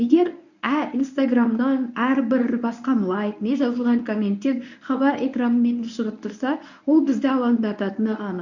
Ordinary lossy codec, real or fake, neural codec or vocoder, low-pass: none; fake; codec, 24 kHz, 0.9 kbps, WavTokenizer, medium speech release version 1; 7.2 kHz